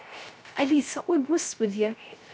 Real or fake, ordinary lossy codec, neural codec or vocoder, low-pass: fake; none; codec, 16 kHz, 0.3 kbps, FocalCodec; none